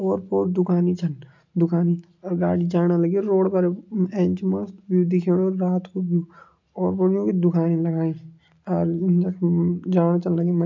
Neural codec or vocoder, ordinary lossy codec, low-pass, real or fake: none; MP3, 64 kbps; 7.2 kHz; real